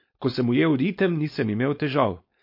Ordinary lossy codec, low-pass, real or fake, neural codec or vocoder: MP3, 32 kbps; 5.4 kHz; fake; codec, 16 kHz, 4.8 kbps, FACodec